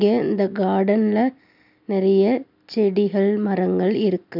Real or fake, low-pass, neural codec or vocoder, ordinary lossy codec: real; 5.4 kHz; none; none